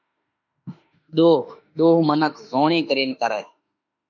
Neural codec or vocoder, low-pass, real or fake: autoencoder, 48 kHz, 32 numbers a frame, DAC-VAE, trained on Japanese speech; 7.2 kHz; fake